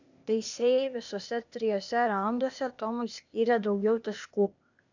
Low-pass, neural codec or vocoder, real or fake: 7.2 kHz; codec, 16 kHz, 0.8 kbps, ZipCodec; fake